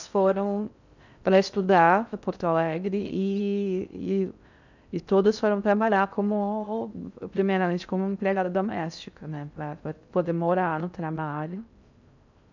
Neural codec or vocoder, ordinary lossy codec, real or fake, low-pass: codec, 16 kHz in and 24 kHz out, 0.6 kbps, FocalCodec, streaming, 2048 codes; none; fake; 7.2 kHz